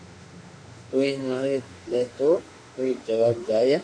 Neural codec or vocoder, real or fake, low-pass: autoencoder, 48 kHz, 32 numbers a frame, DAC-VAE, trained on Japanese speech; fake; 9.9 kHz